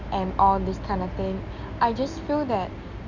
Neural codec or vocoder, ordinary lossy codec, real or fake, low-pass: none; none; real; 7.2 kHz